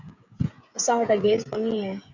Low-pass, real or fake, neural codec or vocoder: 7.2 kHz; fake; codec, 16 kHz, 16 kbps, FreqCodec, smaller model